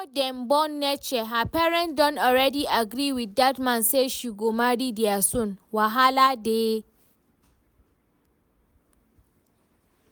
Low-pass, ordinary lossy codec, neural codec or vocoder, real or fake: none; none; none; real